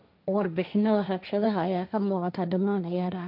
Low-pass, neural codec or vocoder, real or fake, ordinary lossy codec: 5.4 kHz; codec, 16 kHz, 1.1 kbps, Voila-Tokenizer; fake; none